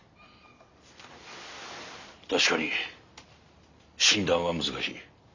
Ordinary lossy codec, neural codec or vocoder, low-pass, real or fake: Opus, 64 kbps; none; 7.2 kHz; real